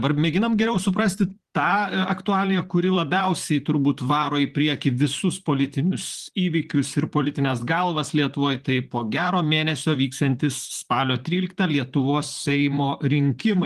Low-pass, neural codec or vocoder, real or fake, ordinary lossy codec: 14.4 kHz; vocoder, 44.1 kHz, 128 mel bands, Pupu-Vocoder; fake; Opus, 24 kbps